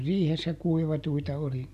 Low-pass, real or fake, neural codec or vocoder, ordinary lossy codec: 14.4 kHz; real; none; none